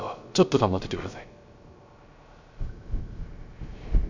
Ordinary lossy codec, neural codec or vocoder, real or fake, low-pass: Opus, 64 kbps; codec, 16 kHz, 0.3 kbps, FocalCodec; fake; 7.2 kHz